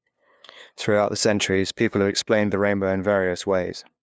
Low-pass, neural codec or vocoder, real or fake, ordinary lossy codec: none; codec, 16 kHz, 2 kbps, FunCodec, trained on LibriTTS, 25 frames a second; fake; none